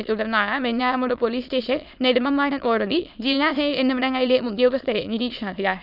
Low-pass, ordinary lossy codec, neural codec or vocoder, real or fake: 5.4 kHz; none; autoencoder, 22.05 kHz, a latent of 192 numbers a frame, VITS, trained on many speakers; fake